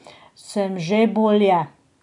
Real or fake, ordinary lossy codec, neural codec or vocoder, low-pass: real; none; none; 10.8 kHz